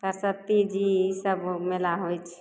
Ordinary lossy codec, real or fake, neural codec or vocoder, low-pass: none; real; none; none